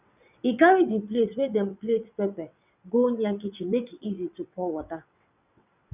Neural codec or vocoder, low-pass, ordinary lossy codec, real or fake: vocoder, 22.05 kHz, 80 mel bands, WaveNeXt; 3.6 kHz; none; fake